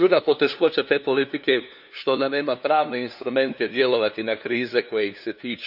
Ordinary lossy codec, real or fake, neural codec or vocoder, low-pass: none; fake; codec, 16 kHz, 2 kbps, FunCodec, trained on LibriTTS, 25 frames a second; 5.4 kHz